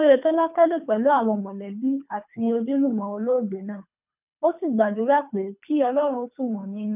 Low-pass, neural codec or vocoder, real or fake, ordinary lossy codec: 3.6 kHz; codec, 24 kHz, 3 kbps, HILCodec; fake; none